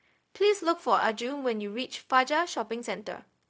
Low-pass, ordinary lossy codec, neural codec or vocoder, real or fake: none; none; codec, 16 kHz, 0.4 kbps, LongCat-Audio-Codec; fake